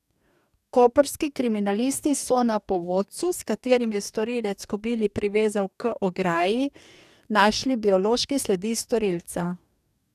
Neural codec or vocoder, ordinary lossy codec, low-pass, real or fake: codec, 44.1 kHz, 2.6 kbps, DAC; none; 14.4 kHz; fake